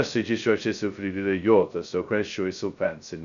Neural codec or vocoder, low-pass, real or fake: codec, 16 kHz, 0.2 kbps, FocalCodec; 7.2 kHz; fake